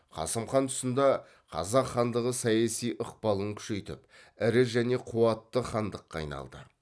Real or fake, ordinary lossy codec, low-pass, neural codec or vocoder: real; none; none; none